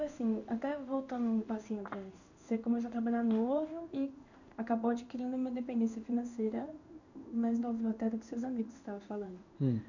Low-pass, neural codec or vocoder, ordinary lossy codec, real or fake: 7.2 kHz; codec, 16 kHz in and 24 kHz out, 1 kbps, XY-Tokenizer; none; fake